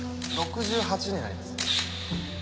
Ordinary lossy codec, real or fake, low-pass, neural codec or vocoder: none; real; none; none